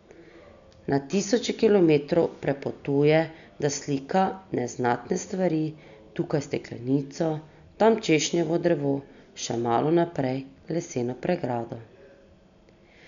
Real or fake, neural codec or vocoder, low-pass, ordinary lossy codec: real; none; 7.2 kHz; none